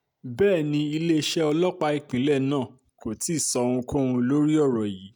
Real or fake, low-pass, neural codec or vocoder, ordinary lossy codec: real; none; none; none